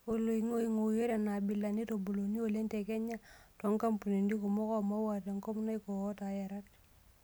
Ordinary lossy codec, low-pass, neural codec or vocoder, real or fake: none; none; none; real